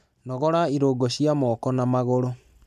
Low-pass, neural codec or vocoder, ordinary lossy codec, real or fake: 14.4 kHz; none; none; real